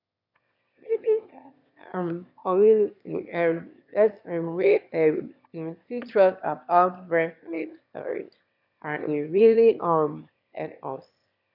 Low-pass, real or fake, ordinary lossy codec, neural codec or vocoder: 5.4 kHz; fake; none; autoencoder, 22.05 kHz, a latent of 192 numbers a frame, VITS, trained on one speaker